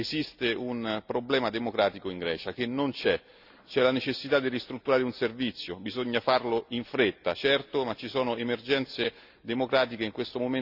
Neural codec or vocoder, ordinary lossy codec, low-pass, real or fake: none; Opus, 64 kbps; 5.4 kHz; real